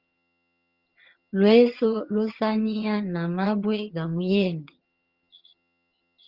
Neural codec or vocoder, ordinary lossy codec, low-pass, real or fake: vocoder, 22.05 kHz, 80 mel bands, HiFi-GAN; Opus, 32 kbps; 5.4 kHz; fake